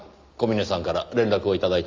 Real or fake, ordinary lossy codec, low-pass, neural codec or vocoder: real; Opus, 32 kbps; 7.2 kHz; none